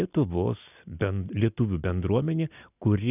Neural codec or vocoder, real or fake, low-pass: none; real; 3.6 kHz